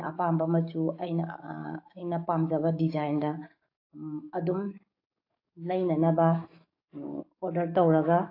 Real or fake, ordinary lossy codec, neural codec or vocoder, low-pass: fake; AAC, 32 kbps; codec, 44.1 kHz, 7.8 kbps, DAC; 5.4 kHz